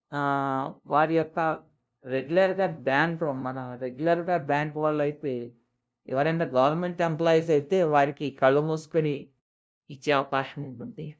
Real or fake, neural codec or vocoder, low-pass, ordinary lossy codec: fake; codec, 16 kHz, 0.5 kbps, FunCodec, trained on LibriTTS, 25 frames a second; none; none